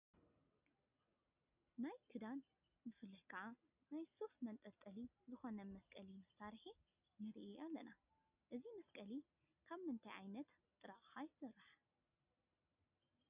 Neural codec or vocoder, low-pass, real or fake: none; 3.6 kHz; real